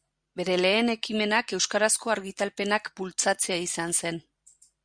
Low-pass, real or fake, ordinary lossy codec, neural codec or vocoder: 9.9 kHz; fake; Opus, 64 kbps; vocoder, 44.1 kHz, 128 mel bands every 512 samples, BigVGAN v2